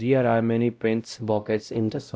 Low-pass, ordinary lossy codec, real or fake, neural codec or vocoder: none; none; fake; codec, 16 kHz, 0.5 kbps, X-Codec, WavLM features, trained on Multilingual LibriSpeech